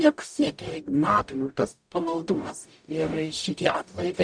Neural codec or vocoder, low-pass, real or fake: codec, 44.1 kHz, 0.9 kbps, DAC; 9.9 kHz; fake